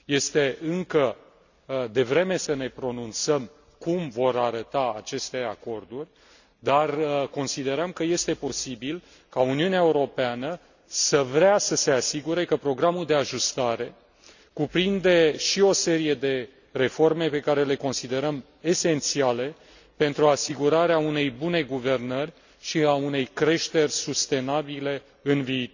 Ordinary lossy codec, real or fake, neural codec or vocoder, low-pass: none; real; none; 7.2 kHz